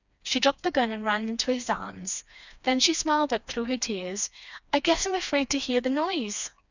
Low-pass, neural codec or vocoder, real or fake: 7.2 kHz; codec, 16 kHz, 2 kbps, FreqCodec, smaller model; fake